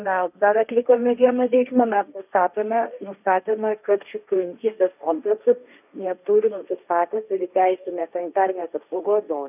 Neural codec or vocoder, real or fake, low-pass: codec, 16 kHz, 1.1 kbps, Voila-Tokenizer; fake; 3.6 kHz